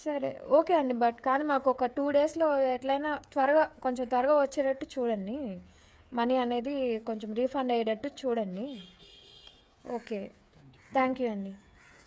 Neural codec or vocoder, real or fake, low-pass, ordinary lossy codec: codec, 16 kHz, 8 kbps, FreqCodec, smaller model; fake; none; none